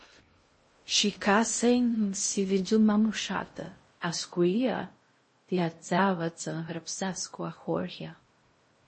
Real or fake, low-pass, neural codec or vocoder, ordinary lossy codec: fake; 9.9 kHz; codec, 16 kHz in and 24 kHz out, 0.6 kbps, FocalCodec, streaming, 2048 codes; MP3, 32 kbps